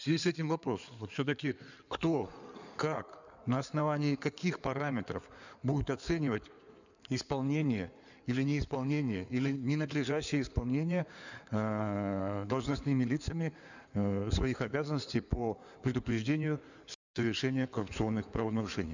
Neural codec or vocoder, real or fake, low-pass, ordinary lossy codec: codec, 16 kHz in and 24 kHz out, 2.2 kbps, FireRedTTS-2 codec; fake; 7.2 kHz; none